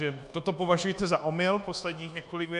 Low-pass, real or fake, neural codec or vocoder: 10.8 kHz; fake; codec, 24 kHz, 1.2 kbps, DualCodec